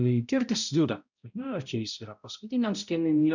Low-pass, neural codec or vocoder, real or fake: 7.2 kHz; codec, 16 kHz, 0.5 kbps, X-Codec, HuBERT features, trained on balanced general audio; fake